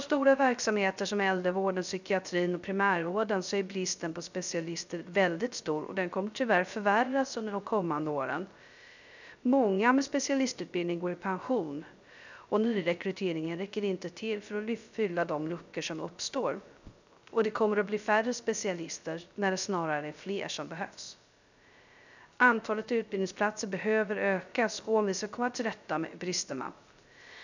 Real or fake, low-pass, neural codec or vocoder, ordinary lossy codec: fake; 7.2 kHz; codec, 16 kHz, 0.3 kbps, FocalCodec; none